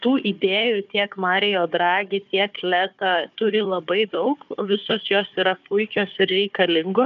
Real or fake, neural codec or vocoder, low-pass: fake; codec, 16 kHz, 4 kbps, FunCodec, trained on Chinese and English, 50 frames a second; 7.2 kHz